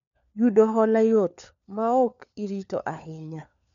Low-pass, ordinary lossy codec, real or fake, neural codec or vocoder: 7.2 kHz; none; fake; codec, 16 kHz, 4 kbps, FunCodec, trained on LibriTTS, 50 frames a second